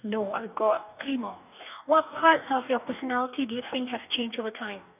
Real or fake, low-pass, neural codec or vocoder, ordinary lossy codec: fake; 3.6 kHz; codec, 44.1 kHz, 2.6 kbps, DAC; none